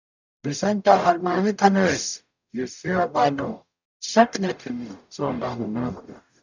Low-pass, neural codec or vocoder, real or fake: 7.2 kHz; codec, 44.1 kHz, 0.9 kbps, DAC; fake